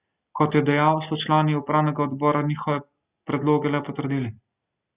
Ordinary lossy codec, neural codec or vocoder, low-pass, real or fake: Opus, 64 kbps; none; 3.6 kHz; real